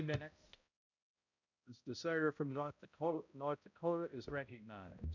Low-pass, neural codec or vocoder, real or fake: 7.2 kHz; codec, 16 kHz, 0.5 kbps, X-Codec, HuBERT features, trained on balanced general audio; fake